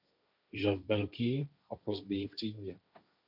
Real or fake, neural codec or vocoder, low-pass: fake; codec, 16 kHz, 1.1 kbps, Voila-Tokenizer; 5.4 kHz